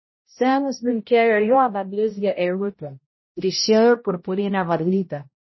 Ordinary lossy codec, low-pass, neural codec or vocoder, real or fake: MP3, 24 kbps; 7.2 kHz; codec, 16 kHz, 0.5 kbps, X-Codec, HuBERT features, trained on balanced general audio; fake